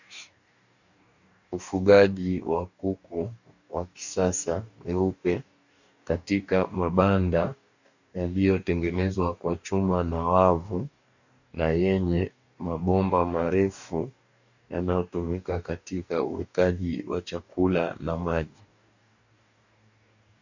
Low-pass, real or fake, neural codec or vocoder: 7.2 kHz; fake; codec, 44.1 kHz, 2.6 kbps, DAC